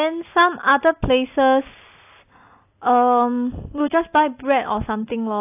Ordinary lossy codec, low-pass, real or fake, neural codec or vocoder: AAC, 24 kbps; 3.6 kHz; real; none